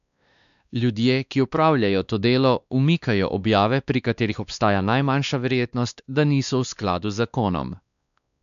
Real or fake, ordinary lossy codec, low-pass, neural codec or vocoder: fake; none; 7.2 kHz; codec, 16 kHz, 2 kbps, X-Codec, WavLM features, trained on Multilingual LibriSpeech